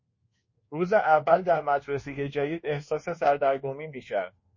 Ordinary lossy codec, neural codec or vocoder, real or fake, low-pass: MP3, 32 kbps; codec, 24 kHz, 1.2 kbps, DualCodec; fake; 7.2 kHz